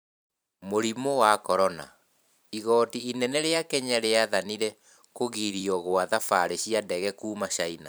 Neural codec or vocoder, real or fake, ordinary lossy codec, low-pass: vocoder, 44.1 kHz, 128 mel bands every 512 samples, BigVGAN v2; fake; none; none